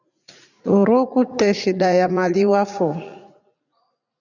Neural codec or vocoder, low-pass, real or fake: vocoder, 44.1 kHz, 80 mel bands, Vocos; 7.2 kHz; fake